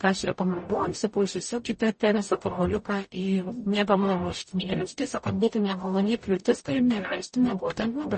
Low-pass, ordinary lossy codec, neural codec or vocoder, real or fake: 10.8 kHz; MP3, 32 kbps; codec, 44.1 kHz, 0.9 kbps, DAC; fake